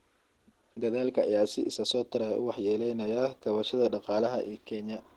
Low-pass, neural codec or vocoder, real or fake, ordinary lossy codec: 19.8 kHz; none; real; Opus, 16 kbps